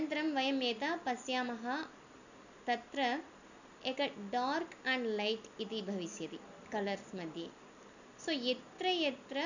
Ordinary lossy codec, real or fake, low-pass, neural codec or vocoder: none; real; 7.2 kHz; none